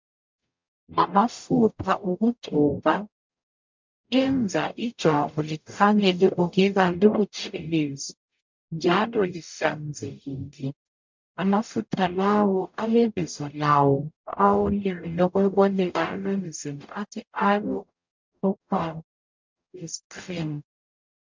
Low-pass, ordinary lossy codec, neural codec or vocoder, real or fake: 7.2 kHz; MP3, 64 kbps; codec, 44.1 kHz, 0.9 kbps, DAC; fake